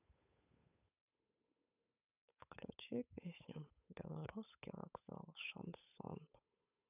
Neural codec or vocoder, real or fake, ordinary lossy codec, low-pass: codec, 16 kHz, 8 kbps, FunCodec, trained on Chinese and English, 25 frames a second; fake; none; 3.6 kHz